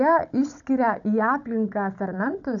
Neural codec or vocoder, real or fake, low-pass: codec, 16 kHz, 16 kbps, FunCodec, trained on Chinese and English, 50 frames a second; fake; 7.2 kHz